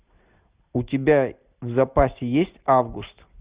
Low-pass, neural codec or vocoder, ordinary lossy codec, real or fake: 3.6 kHz; none; Opus, 64 kbps; real